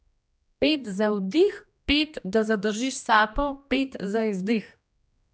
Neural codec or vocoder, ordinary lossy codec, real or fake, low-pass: codec, 16 kHz, 1 kbps, X-Codec, HuBERT features, trained on general audio; none; fake; none